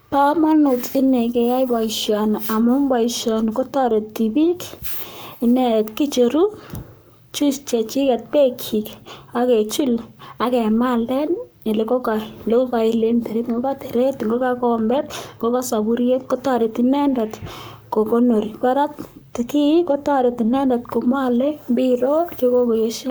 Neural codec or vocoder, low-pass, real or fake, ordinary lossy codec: codec, 44.1 kHz, 7.8 kbps, Pupu-Codec; none; fake; none